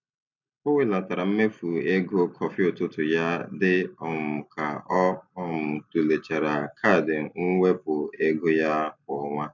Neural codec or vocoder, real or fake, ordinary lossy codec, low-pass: none; real; none; 7.2 kHz